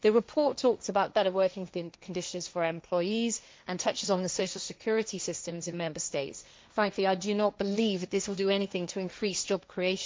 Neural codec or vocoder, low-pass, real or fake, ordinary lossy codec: codec, 16 kHz, 1.1 kbps, Voila-Tokenizer; none; fake; none